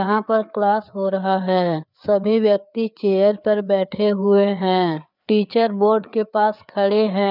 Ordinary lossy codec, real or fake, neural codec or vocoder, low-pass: none; fake; codec, 16 kHz, 4 kbps, FreqCodec, larger model; 5.4 kHz